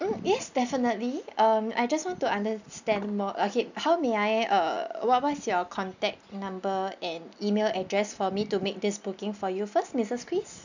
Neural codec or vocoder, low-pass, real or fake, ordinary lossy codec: none; 7.2 kHz; real; none